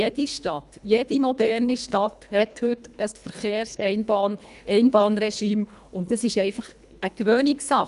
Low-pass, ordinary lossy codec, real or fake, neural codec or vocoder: 10.8 kHz; none; fake; codec, 24 kHz, 1.5 kbps, HILCodec